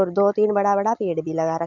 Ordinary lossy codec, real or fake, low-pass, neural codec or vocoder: none; real; 7.2 kHz; none